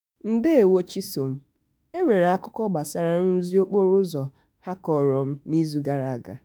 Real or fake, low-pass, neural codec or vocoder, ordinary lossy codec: fake; none; autoencoder, 48 kHz, 32 numbers a frame, DAC-VAE, trained on Japanese speech; none